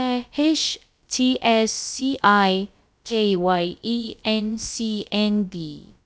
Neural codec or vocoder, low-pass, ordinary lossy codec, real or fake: codec, 16 kHz, about 1 kbps, DyCAST, with the encoder's durations; none; none; fake